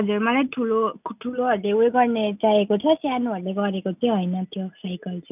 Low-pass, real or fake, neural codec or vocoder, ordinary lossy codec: 3.6 kHz; real; none; none